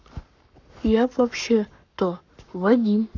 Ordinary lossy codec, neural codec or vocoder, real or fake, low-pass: none; codec, 44.1 kHz, 7.8 kbps, Pupu-Codec; fake; 7.2 kHz